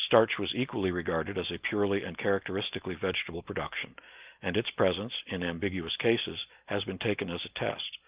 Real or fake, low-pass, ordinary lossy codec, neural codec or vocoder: real; 3.6 kHz; Opus, 32 kbps; none